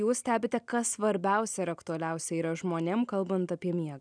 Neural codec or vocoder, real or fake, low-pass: none; real; 9.9 kHz